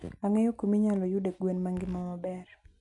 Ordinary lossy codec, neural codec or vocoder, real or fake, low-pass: none; none; real; 10.8 kHz